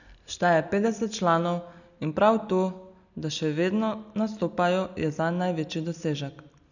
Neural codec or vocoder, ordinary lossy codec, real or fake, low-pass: none; none; real; 7.2 kHz